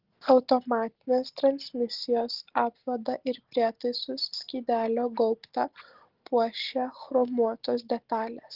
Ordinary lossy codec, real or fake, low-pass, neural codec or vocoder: Opus, 16 kbps; real; 5.4 kHz; none